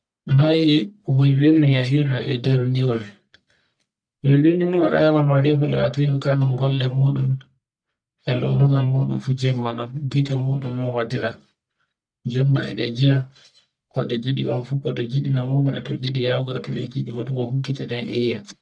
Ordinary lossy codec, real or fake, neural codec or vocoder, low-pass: none; fake; codec, 44.1 kHz, 1.7 kbps, Pupu-Codec; 9.9 kHz